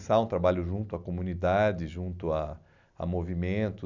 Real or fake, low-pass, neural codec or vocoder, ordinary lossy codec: real; 7.2 kHz; none; none